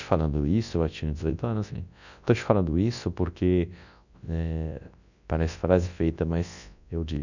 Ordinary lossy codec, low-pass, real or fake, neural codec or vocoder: none; 7.2 kHz; fake; codec, 24 kHz, 0.9 kbps, WavTokenizer, large speech release